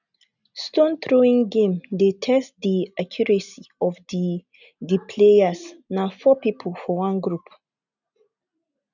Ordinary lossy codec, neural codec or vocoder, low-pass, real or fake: none; none; 7.2 kHz; real